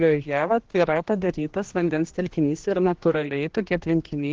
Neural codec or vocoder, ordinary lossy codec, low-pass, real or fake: codec, 16 kHz, 1 kbps, X-Codec, HuBERT features, trained on general audio; Opus, 16 kbps; 7.2 kHz; fake